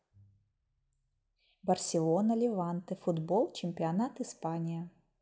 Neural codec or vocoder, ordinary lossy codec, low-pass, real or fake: none; none; none; real